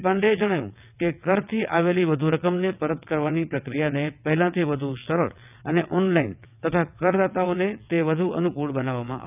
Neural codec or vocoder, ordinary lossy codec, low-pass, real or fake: vocoder, 22.05 kHz, 80 mel bands, WaveNeXt; none; 3.6 kHz; fake